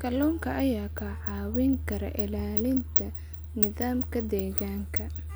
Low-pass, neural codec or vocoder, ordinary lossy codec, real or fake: none; none; none; real